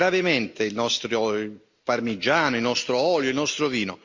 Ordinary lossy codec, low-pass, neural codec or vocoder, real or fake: Opus, 64 kbps; 7.2 kHz; none; real